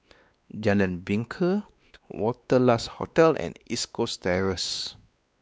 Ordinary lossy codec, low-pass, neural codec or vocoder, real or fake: none; none; codec, 16 kHz, 2 kbps, X-Codec, WavLM features, trained on Multilingual LibriSpeech; fake